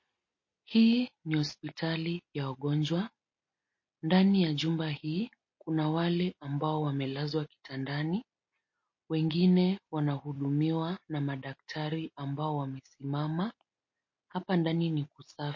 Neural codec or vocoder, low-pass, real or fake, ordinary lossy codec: none; 7.2 kHz; real; MP3, 32 kbps